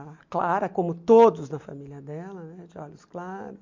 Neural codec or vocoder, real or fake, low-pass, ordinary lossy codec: none; real; 7.2 kHz; MP3, 64 kbps